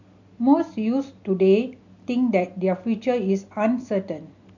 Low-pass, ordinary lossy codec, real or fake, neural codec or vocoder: 7.2 kHz; none; real; none